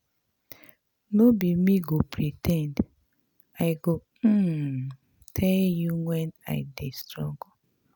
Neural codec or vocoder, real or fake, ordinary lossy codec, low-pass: none; real; none; none